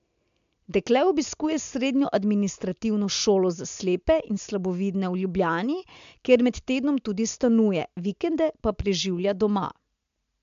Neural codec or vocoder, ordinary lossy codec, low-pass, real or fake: none; MP3, 64 kbps; 7.2 kHz; real